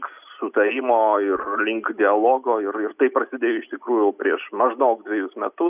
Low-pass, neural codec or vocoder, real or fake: 3.6 kHz; none; real